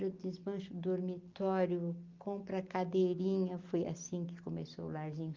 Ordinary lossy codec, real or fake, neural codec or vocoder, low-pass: Opus, 24 kbps; real; none; 7.2 kHz